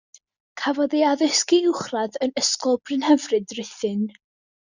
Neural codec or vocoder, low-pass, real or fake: none; 7.2 kHz; real